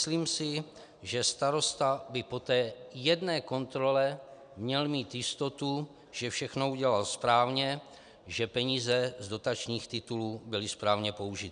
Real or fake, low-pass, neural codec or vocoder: real; 9.9 kHz; none